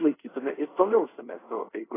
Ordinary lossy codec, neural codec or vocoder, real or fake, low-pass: AAC, 16 kbps; codec, 24 kHz, 0.5 kbps, DualCodec; fake; 3.6 kHz